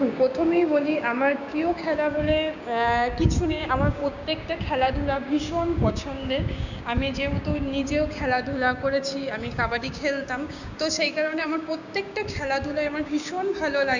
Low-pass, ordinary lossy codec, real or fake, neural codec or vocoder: 7.2 kHz; none; fake; codec, 16 kHz, 6 kbps, DAC